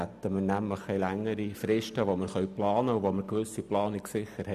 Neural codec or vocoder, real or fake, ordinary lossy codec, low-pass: none; real; none; 14.4 kHz